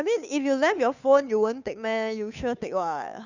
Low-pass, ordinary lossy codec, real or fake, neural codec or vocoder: 7.2 kHz; none; fake; codec, 16 kHz, 2 kbps, FunCodec, trained on Chinese and English, 25 frames a second